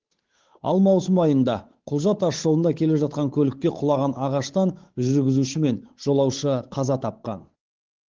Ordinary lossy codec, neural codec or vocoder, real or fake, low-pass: Opus, 16 kbps; codec, 16 kHz, 8 kbps, FunCodec, trained on Chinese and English, 25 frames a second; fake; 7.2 kHz